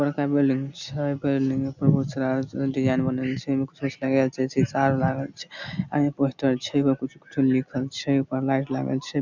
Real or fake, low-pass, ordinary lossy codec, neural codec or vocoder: real; 7.2 kHz; none; none